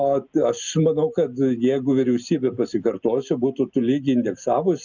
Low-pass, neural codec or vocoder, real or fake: 7.2 kHz; none; real